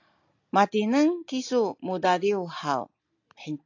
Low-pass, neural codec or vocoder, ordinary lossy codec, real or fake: 7.2 kHz; none; AAC, 48 kbps; real